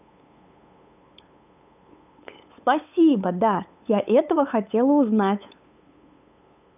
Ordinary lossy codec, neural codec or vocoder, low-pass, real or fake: none; codec, 16 kHz, 8 kbps, FunCodec, trained on LibriTTS, 25 frames a second; 3.6 kHz; fake